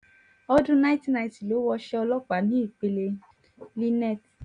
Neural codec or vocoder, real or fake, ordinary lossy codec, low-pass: none; real; none; 9.9 kHz